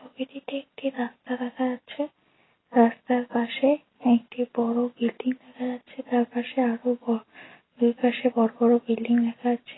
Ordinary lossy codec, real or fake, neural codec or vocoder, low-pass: AAC, 16 kbps; real; none; 7.2 kHz